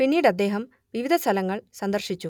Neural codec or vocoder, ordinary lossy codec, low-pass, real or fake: none; none; 19.8 kHz; real